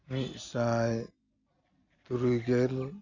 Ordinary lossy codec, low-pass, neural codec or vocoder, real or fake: AAC, 48 kbps; 7.2 kHz; none; real